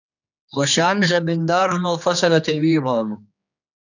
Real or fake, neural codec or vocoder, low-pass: fake; codec, 16 kHz, 2 kbps, X-Codec, HuBERT features, trained on general audio; 7.2 kHz